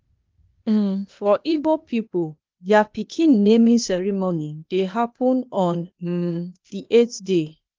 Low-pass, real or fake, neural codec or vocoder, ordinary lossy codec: 7.2 kHz; fake; codec, 16 kHz, 0.8 kbps, ZipCodec; Opus, 24 kbps